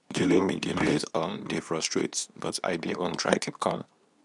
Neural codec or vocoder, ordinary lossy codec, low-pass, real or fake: codec, 24 kHz, 0.9 kbps, WavTokenizer, medium speech release version 2; none; 10.8 kHz; fake